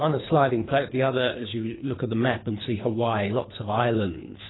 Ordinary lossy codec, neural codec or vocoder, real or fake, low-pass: AAC, 16 kbps; codec, 24 kHz, 3 kbps, HILCodec; fake; 7.2 kHz